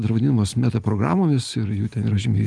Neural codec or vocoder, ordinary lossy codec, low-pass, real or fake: none; Opus, 24 kbps; 10.8 kHz; real